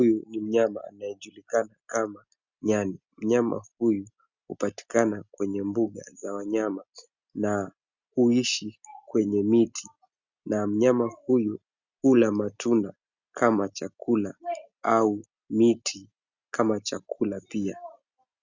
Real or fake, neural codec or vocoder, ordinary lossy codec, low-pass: real; none; Opus, 64 kbps; 7.2 kHz